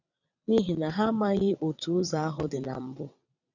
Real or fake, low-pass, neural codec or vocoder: fake; 7.2 kHz; vocoder, 22.05 kHz, 80 mel bands, WaveNeXt